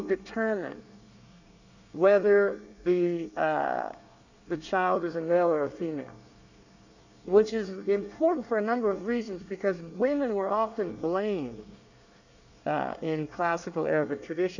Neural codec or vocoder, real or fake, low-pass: codec, 24 kHz, 1 kbps, SNAC; fake; 7.2 kHz